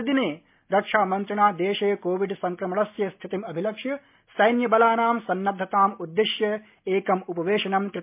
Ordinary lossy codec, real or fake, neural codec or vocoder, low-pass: MP3, 32 kbps; real; none; 3.6 kHz